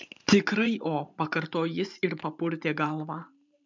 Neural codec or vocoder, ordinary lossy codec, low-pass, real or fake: vocoder, 44.1 kHz, 128 mel bands every 512 samples, BigVGAN v2; MP3, 64 kbps; 7.2 kHz; fake